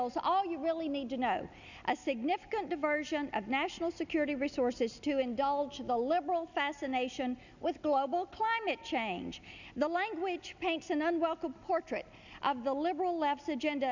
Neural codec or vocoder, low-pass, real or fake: none; 7.2 kHz; real